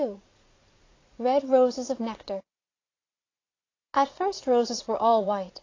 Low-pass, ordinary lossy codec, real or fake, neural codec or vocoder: 7.2 kHz; AAC, 32 kbps; fake; vocoder, 44.1 kHz, 80 mel bands, Vocos